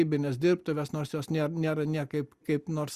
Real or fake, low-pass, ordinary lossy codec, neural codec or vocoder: real; 14.4 kHz; Opus, 64 kbps; none